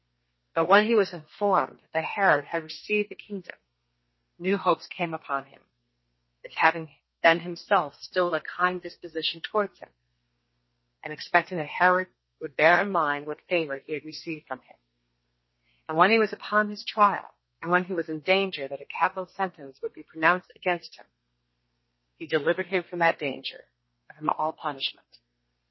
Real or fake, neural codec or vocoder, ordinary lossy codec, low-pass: fake; codec, 32 kHz, 1.9 kbps, SNAC; MP3, 24 kbps; 7.2 kHz